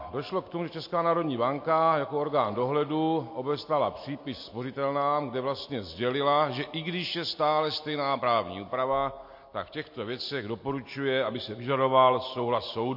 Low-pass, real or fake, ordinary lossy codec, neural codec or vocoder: 5.4 kHz; real; MP3, 32 kbps; none